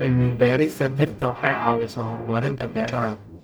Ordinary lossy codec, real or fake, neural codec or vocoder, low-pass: none; fake; codec, 44.1 kHz, 0.9 kbps, DAC; none